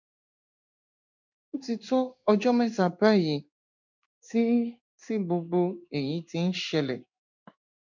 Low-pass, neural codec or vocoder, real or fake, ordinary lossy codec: 7.2 kHz; codec, 16 kHz in and 24 kHz out, 1 kbps, XY-Tokenizer; fake; none